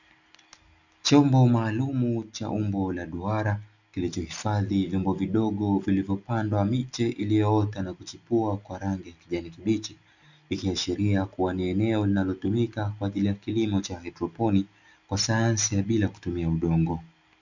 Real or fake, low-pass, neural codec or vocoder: real; 7.2 kHz; none